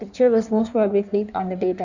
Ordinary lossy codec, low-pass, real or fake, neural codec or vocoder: none; 7.2 kHz; fake; codec, 16 kHz in and 24 kHz out, 1.1 kbps, FireRedTTS-2 codec